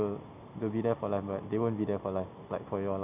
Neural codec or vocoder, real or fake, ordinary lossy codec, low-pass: none; real; none; 3.6 kHz